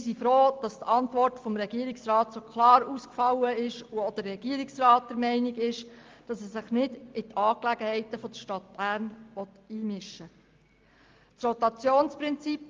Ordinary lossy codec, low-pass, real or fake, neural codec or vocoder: Opus, 32 kbps; 7.2 kHz; real; none